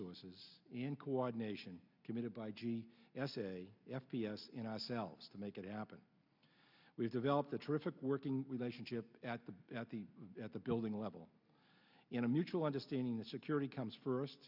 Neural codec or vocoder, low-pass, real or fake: none; 5.4 kHz; real